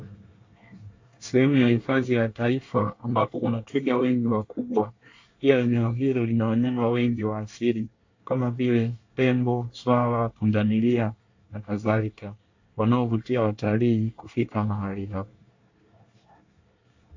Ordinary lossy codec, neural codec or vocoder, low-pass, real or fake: AAC, 48 kbps; codec, 24 kHz, 1 kbps, SNAC; 7.2 kHz; fake